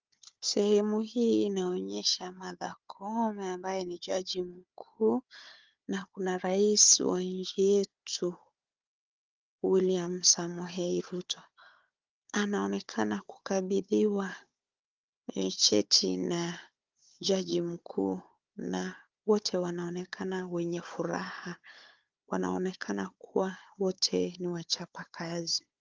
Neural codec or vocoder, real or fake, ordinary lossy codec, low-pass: codec, 16 kHz, 4 kbps, FunCodec, trained on Chinese and English, 50 frames a second; fake; Opus, 32 kbps; 7.2 kHz